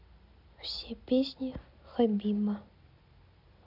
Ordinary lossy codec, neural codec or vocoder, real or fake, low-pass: none; none; real; 5.4 kHz